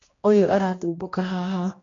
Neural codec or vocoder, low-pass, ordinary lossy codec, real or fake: codec, 16 kHz, 1 kbps, X-Codec, HuBERT features, trained on general audio; 7.2 kHz; AAC, 32 kbps; fake